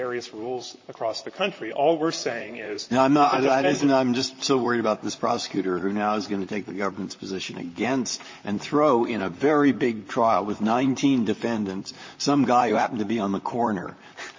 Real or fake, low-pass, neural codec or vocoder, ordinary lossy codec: fake; 7.2 kHz; vocoder, 44.1 kHz, 128 mel bands, Pupu-Vocoder; MP3, 32 kbps